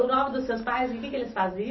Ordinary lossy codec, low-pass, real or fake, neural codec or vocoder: MP3, 24 kbps; 7.2 kHz; real; none